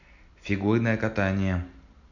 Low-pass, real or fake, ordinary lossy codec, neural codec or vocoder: 7.2 kHz; real; none; none